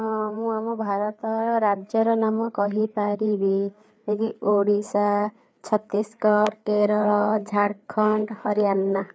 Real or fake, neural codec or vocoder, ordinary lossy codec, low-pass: fake; codec, 16 kHz, 4 kbps, FreqCodec, larger model; none; none